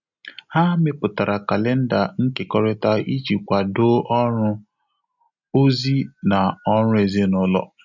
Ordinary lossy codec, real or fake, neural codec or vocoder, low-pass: none; real; none; 7.2 kHz